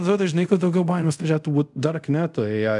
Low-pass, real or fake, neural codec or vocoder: 10.8 kHz; fake; codec, 24 kHz, 0.5 kbps, DualCodec